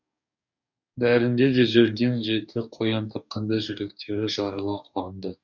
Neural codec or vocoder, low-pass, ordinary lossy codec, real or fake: codec, 44.1 kHz, 2.6 kbps, DAC; 7.2 kHz; none; fake